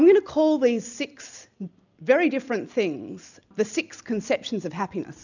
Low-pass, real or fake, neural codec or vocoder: 7.2 kHz; real; none